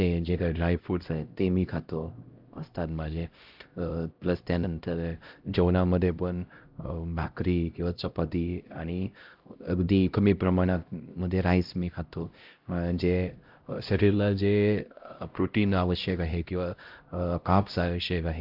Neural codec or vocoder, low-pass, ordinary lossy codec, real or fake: codec, 16 kHz, 0.5 kbps, X-Codec, HuBERT features, trained on LibriSpeech; 5.4 kHz; Opus, 32 kbps; fake